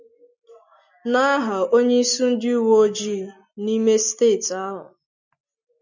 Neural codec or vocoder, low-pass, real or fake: none; 7.2 kHz; real